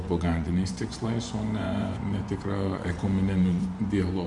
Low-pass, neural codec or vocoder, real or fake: 10.8 kHz; vocoder, 24 kHz, 100 mel bands, Vocos; fake